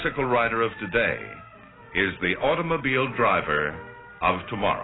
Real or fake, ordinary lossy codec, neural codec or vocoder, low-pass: real; AAC, 16 kbps; none; 7.2 kHz